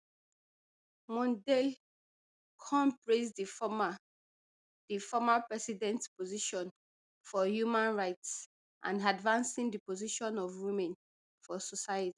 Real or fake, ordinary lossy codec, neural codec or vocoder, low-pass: real; none; none; 10.8 kHz